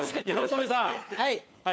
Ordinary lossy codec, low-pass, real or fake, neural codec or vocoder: none; none; fake; codec, 16 kHz, 4 kbps, FunCodec, trained on LibriTTS, 50 frames a second